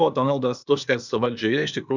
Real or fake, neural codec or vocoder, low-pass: fake; codec, 16 kHz, 0.8 kbps, ZipCodec; 7.2 kHz